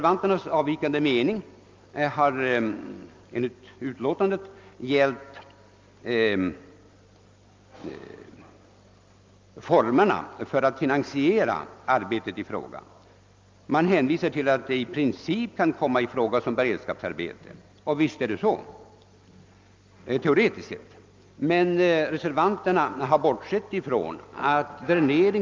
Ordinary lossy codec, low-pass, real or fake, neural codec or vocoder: Opus, 24 kbps; 7.2 kHz; real; none